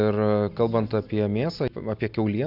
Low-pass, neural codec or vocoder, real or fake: 5.4 kHz; none; real